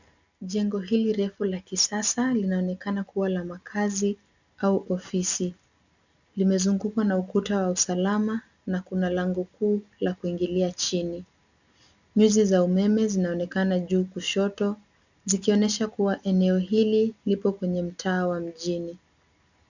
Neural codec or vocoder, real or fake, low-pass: none; real; 7.2 kHz